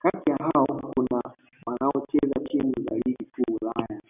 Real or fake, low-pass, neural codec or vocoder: real; 3.6 kHz; none